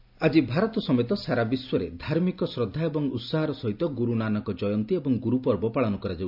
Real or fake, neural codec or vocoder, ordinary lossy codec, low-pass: real; none; MP3, 48 kbps; 5.4 kHz